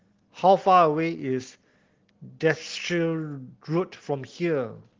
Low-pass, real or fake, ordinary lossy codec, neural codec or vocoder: 7.2 kHz; real; Opus, 16 kbps; none